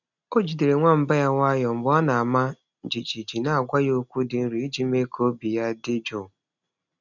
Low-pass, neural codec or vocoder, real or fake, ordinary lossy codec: 7.2 kHz; none; real; none